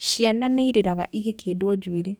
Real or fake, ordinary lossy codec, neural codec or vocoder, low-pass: fake; none; codec, 44.1 kHz, 2.6 kbps, DAC; none